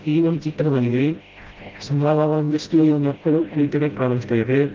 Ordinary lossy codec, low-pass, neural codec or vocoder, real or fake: Opus, 32 kbps; 7.2 kHz; codec, 16 kHz, 0.5 kbps, FreqCodec, smaller model; fake